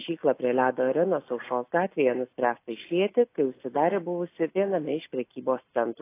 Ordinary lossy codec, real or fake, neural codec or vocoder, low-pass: AAC, 24 kbps; real; none; 3.6 kHz